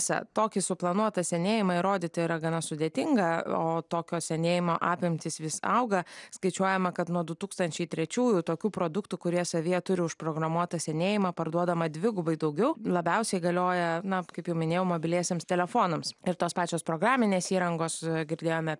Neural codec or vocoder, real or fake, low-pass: none; real; 10.8 kHz